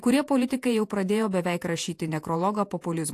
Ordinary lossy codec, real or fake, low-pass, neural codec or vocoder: AAC, 64 kbps; fake; 14.4 kHz; vocoder, 48 kHz, 128 mel bands, Vocos